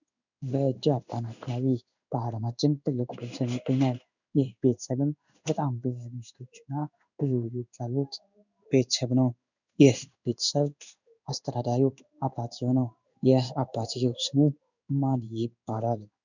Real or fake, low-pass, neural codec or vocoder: fake; 7.2 kHz; codec, 16 kHz in and 24 kHz out, 1 kbps, XY-Tokenizer